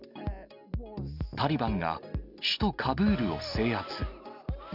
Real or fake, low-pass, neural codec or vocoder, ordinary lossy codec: real; 5.4 kHz; none; none